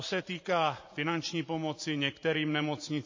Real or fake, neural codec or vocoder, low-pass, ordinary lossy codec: fake; vocoder, 44.1 kHz, 128 mel bands every 512 samples, BigVGAN v2; 7.2 kHz; MP3, 32 kbps